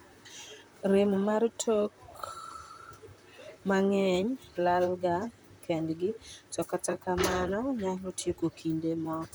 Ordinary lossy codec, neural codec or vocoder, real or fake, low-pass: none; vocoder, 44.1 kHz, 128 mel bands, Pupu-Vocoder; fake; none